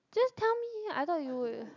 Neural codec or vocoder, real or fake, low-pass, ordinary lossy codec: none; real; 7.2 kHz; none